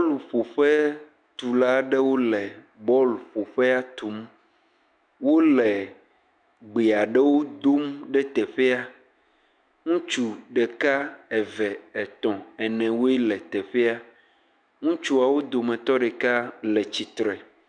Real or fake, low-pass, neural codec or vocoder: fake; 9.9 kHz; autoencoder, 48 kHz, 128 numbers a frame, DAC-VAE, trained on Japanese speech